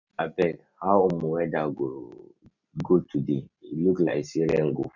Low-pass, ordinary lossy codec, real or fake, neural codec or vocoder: 7.2 kHz; none; real; none